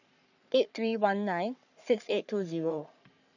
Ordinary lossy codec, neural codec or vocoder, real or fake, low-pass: none; codec, 44.1 kHz, 3.4 kbps, Pupu-Codec; fake; 7.2 kHz